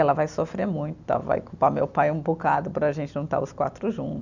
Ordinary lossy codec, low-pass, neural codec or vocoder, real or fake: none; 7.2 kHz; none; real